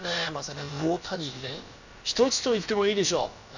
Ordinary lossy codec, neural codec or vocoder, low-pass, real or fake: none; codec, 16 kHz, about 1 kbps, DyCAST, with the encoder's durations; 7.2 kHz; fake